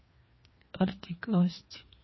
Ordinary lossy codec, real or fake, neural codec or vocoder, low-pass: MP3, 24 kbps; fake; codec, 16 kHz, 2 kbps, FreqCodec, larger model; 7.2 kHz